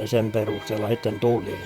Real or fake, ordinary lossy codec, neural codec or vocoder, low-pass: fake; Opus, 64 kbps; vocoder, 44.1 kHz, 128 mel bands, Pupu-Vocoder; 19.8 kHz